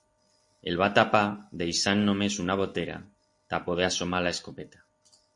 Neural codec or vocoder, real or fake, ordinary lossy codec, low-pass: none; real; MP3, 48 kbps; 10.8 kHz